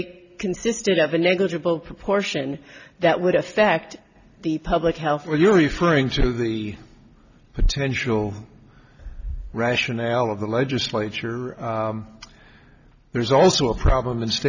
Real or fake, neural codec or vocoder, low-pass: real; none; 7.2 kHz